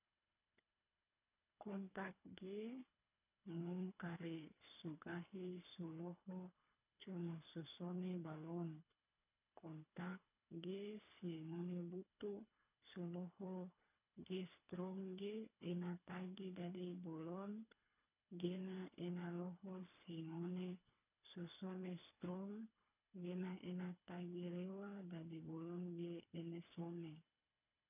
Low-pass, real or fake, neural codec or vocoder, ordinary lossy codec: 3.6 kHz; fake; codec, 24 kHz, 3 kbps, HILCodec; none